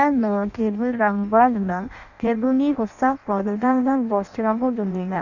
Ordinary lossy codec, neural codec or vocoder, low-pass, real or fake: none; codec, 16 kHz in and 24 kHz out, 0.6 kbps, FireRedTTS-2 codec; 7.2 kHz; fake